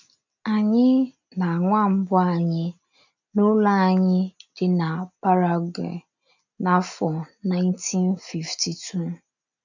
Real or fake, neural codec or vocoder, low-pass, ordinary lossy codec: real; none; 7.2 kHz; none